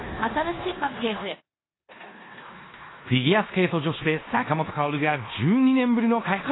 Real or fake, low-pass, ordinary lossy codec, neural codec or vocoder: fake; 7.2 kHz; AAC, 16 kbps; codec, 16 kHz in and 24 kHz out, 0.9 kbps, LongCat-Audio-Codec, four codebook decoder